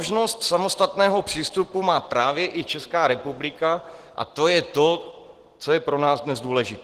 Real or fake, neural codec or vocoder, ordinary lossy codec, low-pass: real; none; Opus, 16 kbps; 14.4 kHz